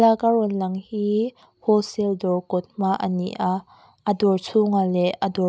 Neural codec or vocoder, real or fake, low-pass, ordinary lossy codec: none; real; none; none